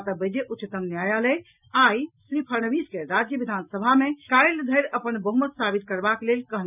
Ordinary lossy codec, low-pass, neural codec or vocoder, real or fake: none; 3.6 kHz; none; real